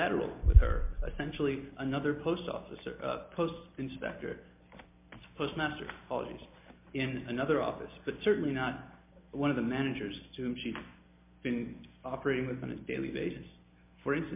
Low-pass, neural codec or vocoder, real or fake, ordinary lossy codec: 3.6 kHz; none; real; MP3, 32 kbps